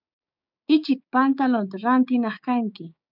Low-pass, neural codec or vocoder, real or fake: 5.4 kHz; codec, 16 kHz, 6 kbps, DAC; fake